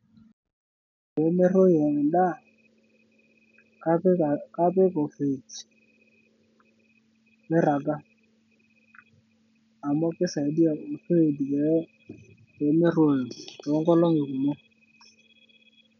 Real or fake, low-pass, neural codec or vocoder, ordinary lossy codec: real; 7.2 kHz; none; none